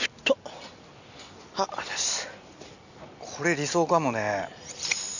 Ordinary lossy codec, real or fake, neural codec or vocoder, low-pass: none; real; none; 7.2 kHz